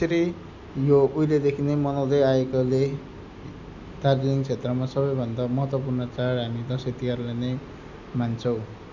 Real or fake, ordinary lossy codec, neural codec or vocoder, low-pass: real; none; none; 7.2 kHz